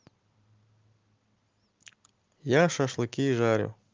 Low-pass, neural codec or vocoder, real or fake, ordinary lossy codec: 7.2 kHz; none; real; Opus, 32 kbps